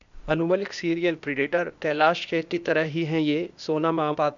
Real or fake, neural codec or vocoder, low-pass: fake; codec, 16 kHz, 0.8 kbps, ZipCodec; 7.2 kHz